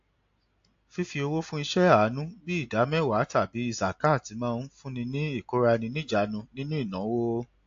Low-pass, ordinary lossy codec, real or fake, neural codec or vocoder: 7.2 kHz; AAC, 48 kbps; real; none